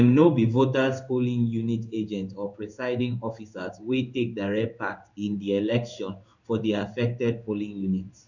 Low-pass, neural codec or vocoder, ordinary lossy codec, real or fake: 7.2 kHz; codec, 16 kHz in and 24 kHz out, 1 kbps, XY-Tokenizer; none; fake